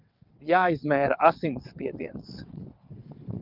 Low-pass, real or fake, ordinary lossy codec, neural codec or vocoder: 5.4 kHz; fake; Opus, 32 kbps; vocoder, 22.05 kHz, 80 mel bands, WaveNeXt